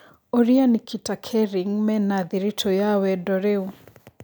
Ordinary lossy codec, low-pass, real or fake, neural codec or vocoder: none; none; real; none